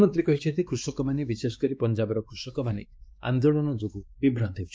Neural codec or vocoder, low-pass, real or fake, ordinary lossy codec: codec, 16 kHz, 2 kbps, X-Codec, WavLM features, trained on Multilingual LibriSpeech; none; fake; none